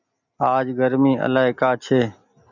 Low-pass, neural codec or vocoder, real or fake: 7.2 kHz; none; real